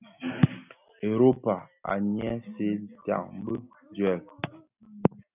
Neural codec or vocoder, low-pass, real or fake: none; 3.6 kHz; real